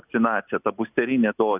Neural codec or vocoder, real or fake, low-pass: none; real; 3.6 kHz